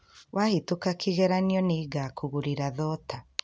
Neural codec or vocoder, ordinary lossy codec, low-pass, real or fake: none; none; none; real